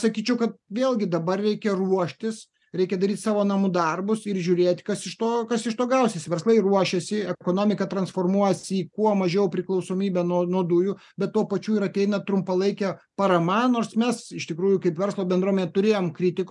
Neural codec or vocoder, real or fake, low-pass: none; real; 10.8 kHz